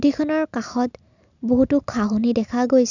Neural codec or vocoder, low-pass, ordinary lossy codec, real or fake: none; 7.2 kHz; none; real